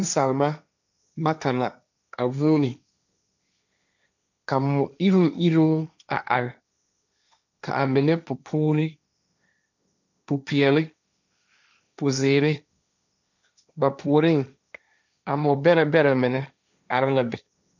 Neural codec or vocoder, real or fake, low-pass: codec, 16 kHz, 1.1 kbps, Voila-Tokenizer; fake; 7.2 kHz